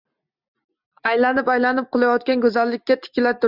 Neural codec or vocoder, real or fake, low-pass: none; real; 5.4 kHz